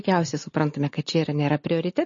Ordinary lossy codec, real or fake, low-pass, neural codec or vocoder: MP3, 32 kbps; real; 7.2 kHz; none